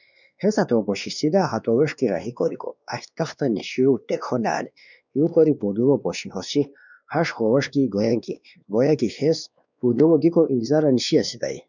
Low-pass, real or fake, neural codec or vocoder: 7.2 kHz; fake; codec, 16 kHz, 2 kbps, X-Codec, WavLM features, trained on Multilingual LibriSpeech